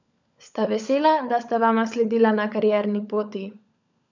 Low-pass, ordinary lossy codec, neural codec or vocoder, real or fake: 7.2 kHz; none; codec, 16 kHz, 16 kbps, FunCodec, trained on LibriTTS, 50 frames a second; fake